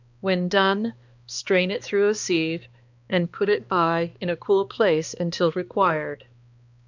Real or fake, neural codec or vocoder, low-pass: fake; codec, 16 kHz, 2 kbps, X-Codec, HuBERT features, trained on balanced general audio; 7.2 kHz